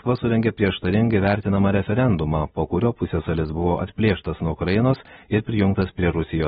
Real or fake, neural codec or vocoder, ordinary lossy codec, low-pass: real; none; AAC, 16 kbps; 19.8 kHz